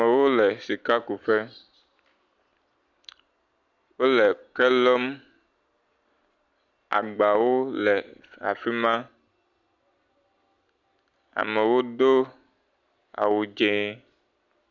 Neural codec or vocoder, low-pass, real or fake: none; 7.2 kHz; real